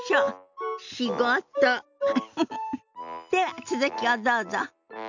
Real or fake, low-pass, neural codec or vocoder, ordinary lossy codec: real; 7.2 kHz; none; none